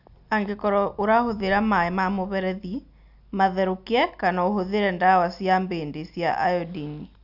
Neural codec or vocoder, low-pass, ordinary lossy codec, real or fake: none; 5.4 kHz; none; real